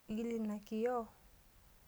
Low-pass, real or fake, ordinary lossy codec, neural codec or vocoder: none; real; none; none